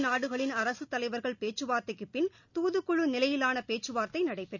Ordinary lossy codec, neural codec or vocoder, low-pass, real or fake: none; none; 7.2 kHz; real